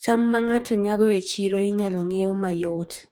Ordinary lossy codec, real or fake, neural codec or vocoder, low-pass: none; fake; codec, 44.1 kHz, 2.6 kbps, DAC; none